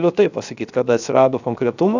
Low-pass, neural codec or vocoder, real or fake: 7.2 kHz; codec, 16 kHz, 0.7 kbps, FocalCodec; fake